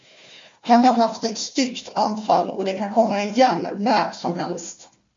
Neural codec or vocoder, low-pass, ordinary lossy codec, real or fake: codec, 16 kHz, 1 kbps, FunCodec, trained on Chinese and English, 50 frames a second; 7.2 kHz; MP3, 48 kbps; fake